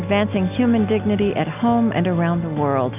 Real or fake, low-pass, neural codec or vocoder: real; 3.6 kHz; none